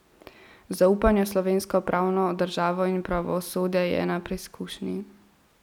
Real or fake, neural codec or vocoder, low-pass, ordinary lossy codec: real; none; 19.8 kHz; none